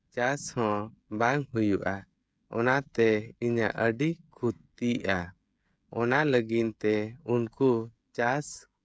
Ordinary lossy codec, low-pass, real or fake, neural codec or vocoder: none; none; fake; codec, 16 kHz, 16 kbps, FreqCodec, smaller model